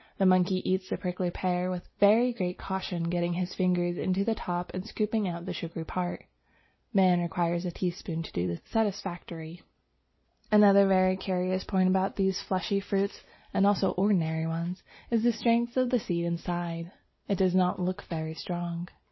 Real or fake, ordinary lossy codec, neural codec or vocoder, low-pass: real; MP3, 24 kbps; none; 7.2 kHz